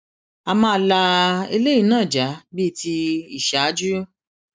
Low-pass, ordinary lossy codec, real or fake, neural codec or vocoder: none; none; real; none